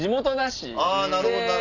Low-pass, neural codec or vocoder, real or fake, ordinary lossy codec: 7.2 kHz; none; real; none